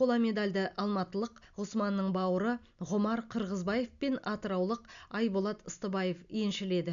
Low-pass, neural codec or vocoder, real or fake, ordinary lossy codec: 7.2 kHz; none; real; none